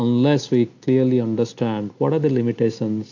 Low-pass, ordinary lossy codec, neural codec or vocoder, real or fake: 7.2 kHz; AAC, 48 kbps; none; real